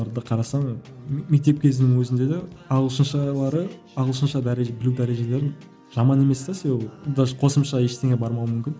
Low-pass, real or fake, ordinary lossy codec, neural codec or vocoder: none; real; none; none